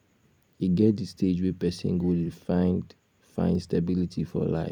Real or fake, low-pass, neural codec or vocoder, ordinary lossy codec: fake; 19.8 kHz; vocoder, 44.1 kHz, 128 mel bands every 256 samples, BigVGAN v2; none